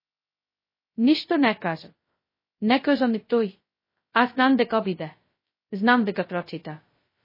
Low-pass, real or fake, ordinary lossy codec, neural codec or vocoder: 5.4 kHz; fake; MP3, 24 kbps; codec, 16 kHz, 0.2 kbps, FocalCodec